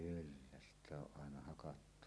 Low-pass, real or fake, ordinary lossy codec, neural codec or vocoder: none; real; none; none